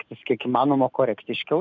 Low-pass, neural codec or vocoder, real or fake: 7.2 kHz; none; real